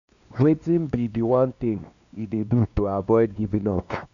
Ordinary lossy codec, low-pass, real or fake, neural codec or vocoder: none; 7.2 kHz; fake; codec, 16 kHz, 2 kbps, X-Codec, WavLM features, trained on Multilingual LibriSpeech